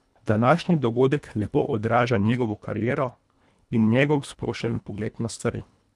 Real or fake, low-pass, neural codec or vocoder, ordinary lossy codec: fake; none; codec, 24 kHz, 1.5 kbps, HILCodec; none